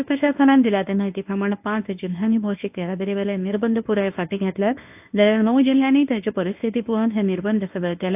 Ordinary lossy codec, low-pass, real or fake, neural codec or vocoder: AAC, 32 kbps; 3.6 kHz; fake; codec, 24 kHz, 0.9 kbps, WavTokenizer, medium speech release version 1